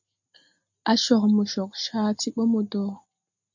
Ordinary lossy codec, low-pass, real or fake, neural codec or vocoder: MP3, 48 kbps; 7.2 kHz; real; none